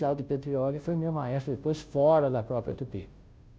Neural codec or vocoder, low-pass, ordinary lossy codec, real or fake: codec, 16 kHz, 0.5 kbps, FunCodec, trained on Chinese and English, 25 frames a second; none; none; fake